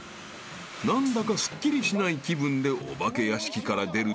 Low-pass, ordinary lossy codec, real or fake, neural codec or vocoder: none; none; real; none